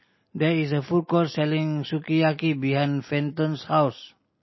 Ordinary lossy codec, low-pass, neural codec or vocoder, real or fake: MP3, 24 kbps; 7.2 kHz; none; real